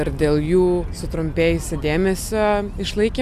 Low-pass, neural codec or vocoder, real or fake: 14.4 kHz; none; real